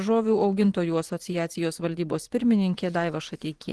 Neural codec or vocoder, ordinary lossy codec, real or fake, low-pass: vocoder, 24 kHz, 100 mel bands, Vocos; Opus, 16 kbps; fake; 10.8 kHz